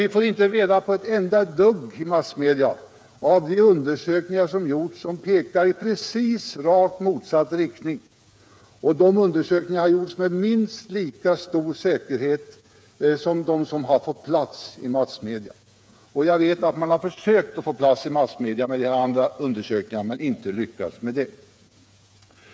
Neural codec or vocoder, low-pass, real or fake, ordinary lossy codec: codec, 16 kHz, 8 kbps, FreqCodec, smaller model; none; fake; none